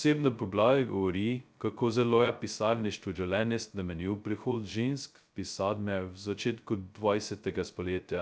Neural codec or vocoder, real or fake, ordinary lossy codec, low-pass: codec, 16 kHz, 0.2 kbps, FocalCodec; fake; none; none